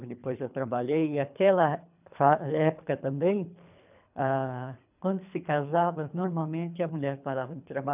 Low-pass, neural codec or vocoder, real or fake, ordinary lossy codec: 3.6 kHz; codec, 24 kHz, 3 kbps, HILCodec; fake; none